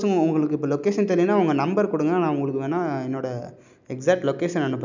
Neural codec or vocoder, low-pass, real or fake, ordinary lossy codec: none; 7.2 kHz; real; none